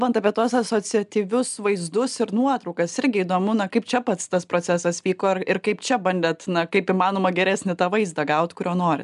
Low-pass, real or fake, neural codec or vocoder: 10.8 kHz; real; none